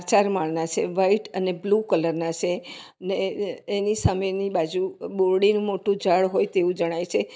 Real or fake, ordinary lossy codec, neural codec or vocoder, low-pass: real; none; none; none